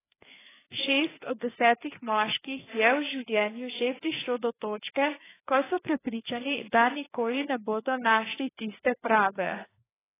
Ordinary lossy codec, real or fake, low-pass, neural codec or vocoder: AAC, 16 kbps; fake; 3.6 kHz; codec, 16 kHz, 2 kbps, FreqCodec, larger model